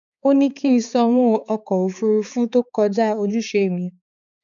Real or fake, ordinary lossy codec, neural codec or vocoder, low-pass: fake; none; codec, 16 kHz, 4 kbps, X-Codec, HuBERT features, trained on balanced general audio; 7.2 kHz